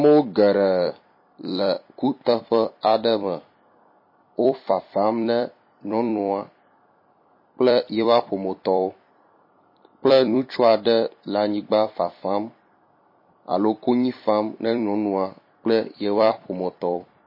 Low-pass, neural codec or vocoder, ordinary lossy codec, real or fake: 5.4 kHz; vocoder, 44.1 kHz, 128 mel bands every 256 samples, BigVGAN v2; MP3, 24 kbps; fake